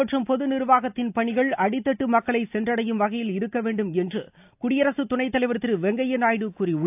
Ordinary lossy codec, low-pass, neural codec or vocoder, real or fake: AAC, 32 kbps; 3.6 kHz; none; real